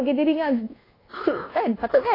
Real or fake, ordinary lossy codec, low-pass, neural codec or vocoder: fake; AAC, 24 kbps; 5.4 kHz; codec, 24 kHz, 1.2 kbps, DualCodec